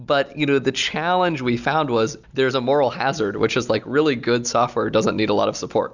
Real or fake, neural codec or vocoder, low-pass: real; none; 7.2 kHz